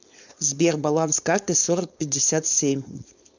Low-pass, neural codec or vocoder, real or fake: 7.2 kHz; codec, 16 kHz, 4.8 kbps, FACodec; fake